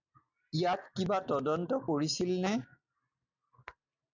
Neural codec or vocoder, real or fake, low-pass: none; real; 7.2 kHz